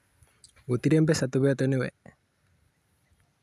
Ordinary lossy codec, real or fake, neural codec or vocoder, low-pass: none; real; none; 14.4 kHz